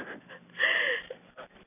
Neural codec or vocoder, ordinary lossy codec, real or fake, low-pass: none; none; real; 3.6 kHz